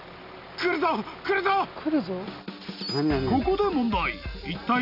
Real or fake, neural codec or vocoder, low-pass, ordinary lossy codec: real; none; 5.4 kHz; none